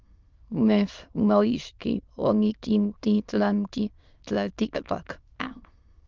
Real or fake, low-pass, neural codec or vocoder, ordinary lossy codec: fake; 7.2 kHz; autoencoder, 22.05 kHz, a latent of 192 numbers a frame, VITS, trained on many speakers; Opus, 32 kbps